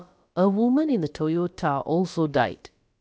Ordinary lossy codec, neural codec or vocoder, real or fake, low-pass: none; codec, 16 kHz, about 1 kbps, DyCAST, with the encoder's durations; fake; none